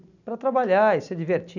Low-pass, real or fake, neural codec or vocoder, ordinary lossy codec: 7.2 kHz; real; none; none